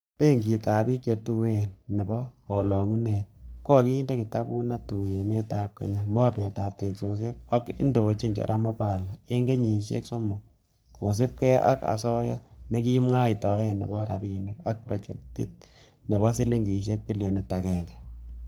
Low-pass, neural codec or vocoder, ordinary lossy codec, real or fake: none; codec, 44.1 kHz, 3.4 kbps, Pupu-Codec; none; fake